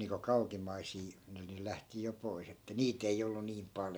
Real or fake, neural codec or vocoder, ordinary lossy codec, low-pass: real; none; none; none